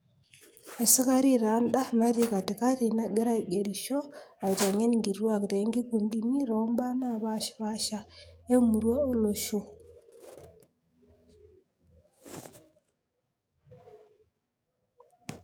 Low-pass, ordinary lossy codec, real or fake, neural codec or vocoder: none; none; fake; codec, 44.1 kHz, 7.8 kbps, DAC